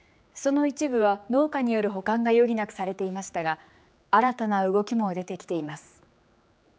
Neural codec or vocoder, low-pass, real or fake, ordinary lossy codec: codec, 16 kHz, 4 kbps, X-Codec, HuBERT features, trained on general audio; none; fake; none